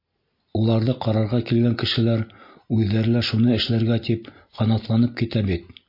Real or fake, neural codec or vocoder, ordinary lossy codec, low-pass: real; none; MP3, 32 kbps; 5.4 kHz